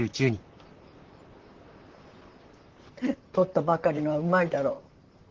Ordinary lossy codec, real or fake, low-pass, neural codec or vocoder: Opus, 16 kbps; fake; 7.2 kHz; vocoder, 44.1 kHz, 128 mel bands, Pupu-Vocoder